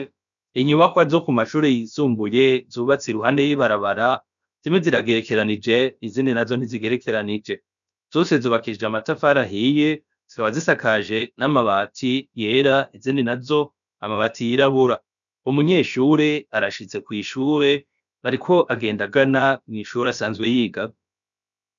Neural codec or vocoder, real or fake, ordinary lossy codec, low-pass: codec, 16 kHz, about 1 kbps, DyCAST, with the encoder's durations; fake; MP3, 96 kbps; 7.2 kHz